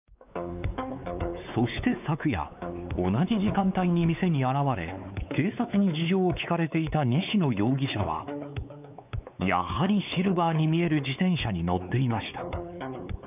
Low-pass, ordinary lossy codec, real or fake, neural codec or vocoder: 3.6 kHz; none; fake; codec, 16 kHz, 4 kbps, X-Codec, WavLM features, trained on Multilingual LibriSpeech